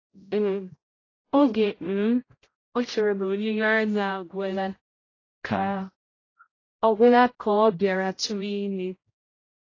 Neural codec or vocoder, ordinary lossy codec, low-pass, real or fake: codec, 16 kHz, 0.5 kbps, X-Codec, HuBERT features, trained on general audio; AAC, 32 kbps; 7.2 kHz; fake